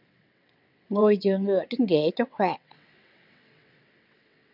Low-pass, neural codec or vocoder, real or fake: 5.4 kHz; vocoder, 22.05 kHz, 80 mel bands, WaveNeXt; fake